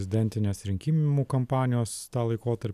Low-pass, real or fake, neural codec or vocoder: 14.4 kHz; real; none